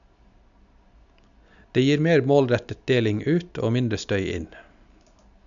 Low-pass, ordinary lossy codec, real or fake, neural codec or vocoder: 7.2 kHz; none; real; none